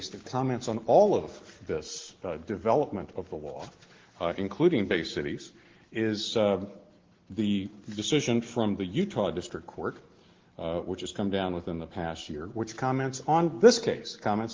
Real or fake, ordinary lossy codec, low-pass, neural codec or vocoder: real; Opus, 16 kbps; 7.2 kHz; none